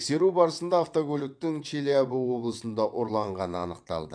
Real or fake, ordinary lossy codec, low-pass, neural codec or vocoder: fake; none; 9.9 kHz; vocoder, 22.05 kHz, 80 mel bands, WaveNeXt